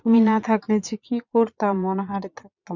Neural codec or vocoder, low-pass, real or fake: vocoder, 22.05 kHz, 80 mel bands, Vocos; 7.2 kHz; fake